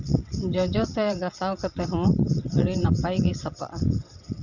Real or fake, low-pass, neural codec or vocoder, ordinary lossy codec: fake; 7.2 kHz; vocoder, 22.05 kHz, 80 mel bands, WaveNeXt; none